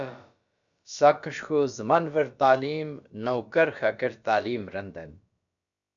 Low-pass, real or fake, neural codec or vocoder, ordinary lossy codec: 7.2 kHz; fake; codec, 16 kHz, about 1 kbps, DyCAST, with the encoder's durations; MP3, 96 kbps